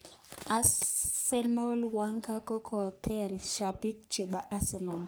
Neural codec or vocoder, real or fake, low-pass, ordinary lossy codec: codec, 44.1 kHz, 3.4 kbps, Pupu-Codec; fake; none; none